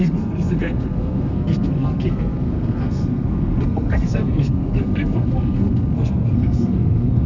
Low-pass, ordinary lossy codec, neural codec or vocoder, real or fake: 7.2 kHz; none; codec, 32 kHz, 1.9 kbps, SNAC; fake